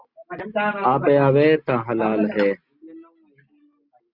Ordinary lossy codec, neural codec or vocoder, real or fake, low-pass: Opus, 64 kbps; none; real; 5.4 kHz